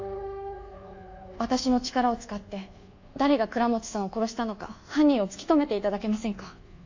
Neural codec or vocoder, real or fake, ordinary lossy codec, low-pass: codec, 24 kHz, 1.2 kbps, DualCodec; fake; AAC, 48 kbps; 7.2 kHz